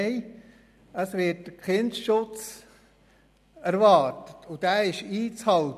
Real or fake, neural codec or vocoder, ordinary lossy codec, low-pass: real; none; none; 14.4 kHz